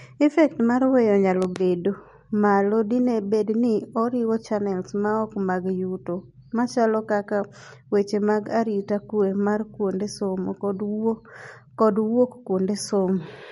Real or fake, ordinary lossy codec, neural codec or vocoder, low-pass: real; MP3, 48 kbps; none; 19.8 kHz